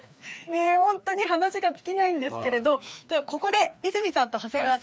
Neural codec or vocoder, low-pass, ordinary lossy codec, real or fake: codec, 16 kHz, 2 kbps, FreqCodec, larger model; none; none; fake